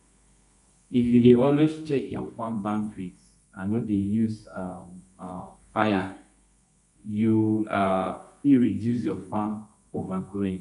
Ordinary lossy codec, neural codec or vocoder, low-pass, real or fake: none; codec, 24 kHz, 0.9 kbps, WavTokenizer, medium music audio release; 10.8 kHz; fake